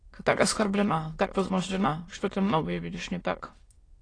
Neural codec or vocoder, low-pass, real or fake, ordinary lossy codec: autoencoder, 22.05 kHz, a latent of 192 numbers a frame, VITS, trained on many speakers; 9.9 kHz; fake; AAC, 32 kbps